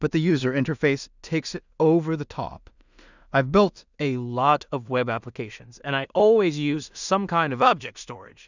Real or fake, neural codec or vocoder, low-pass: fake; codec, 16 kHz in and 24 kHz out, 0.4 kbps, LongCat-Audio-Codec, two codebook decoder; 7.2 kHz